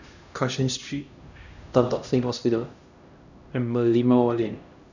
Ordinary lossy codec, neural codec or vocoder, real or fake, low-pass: none; codec, 16 kHz, 1 kbps, X-Codec, HuBERT features, trained on LibriSpeech; fake; 7.2 kHz